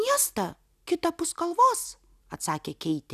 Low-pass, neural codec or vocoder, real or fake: 14.4 kHz; none; real